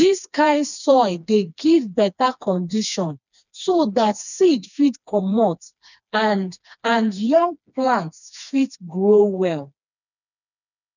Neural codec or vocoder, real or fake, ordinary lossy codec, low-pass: codec, 16 kHz, 2 kbps, FreqCodec, smaller model; fake; none; 7.2 kHz